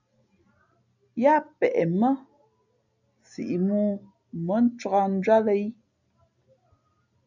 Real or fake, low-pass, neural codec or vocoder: real; 7.2 kHz; none